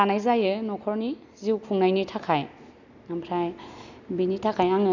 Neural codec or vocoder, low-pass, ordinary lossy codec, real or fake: none; 7.2 kHz; none; real